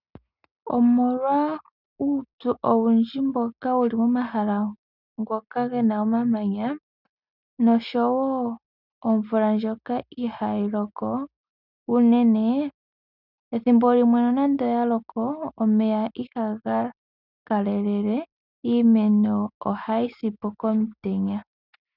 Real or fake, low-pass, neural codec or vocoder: real; 5.4 kHz; none